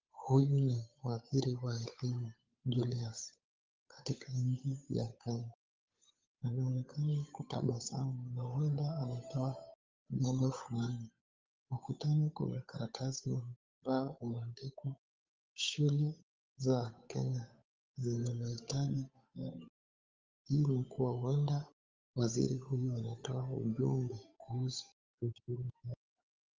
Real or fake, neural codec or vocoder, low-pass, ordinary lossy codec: fake; codec, 16 kHz, 8 kbps, FunCodec, trained on Chinese and English, 25 frames a second; 7.2 kHz; Opus, 32 kbps